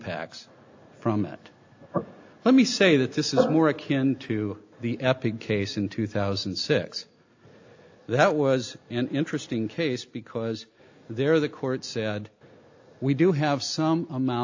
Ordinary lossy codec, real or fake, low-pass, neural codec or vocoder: AAC, 48 kbps; real; 7.2 kHz; none